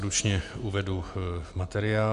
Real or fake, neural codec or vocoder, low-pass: real; none; 10.8 kHz